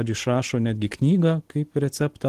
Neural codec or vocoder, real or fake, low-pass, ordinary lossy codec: none; real; 14.4 kHz; Opus, 16 kbps